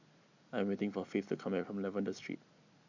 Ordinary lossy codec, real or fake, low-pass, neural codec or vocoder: none; real; 7.2 kHz; none